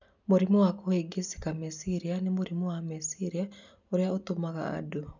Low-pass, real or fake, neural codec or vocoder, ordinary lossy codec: 7.2 kHz; real; none; none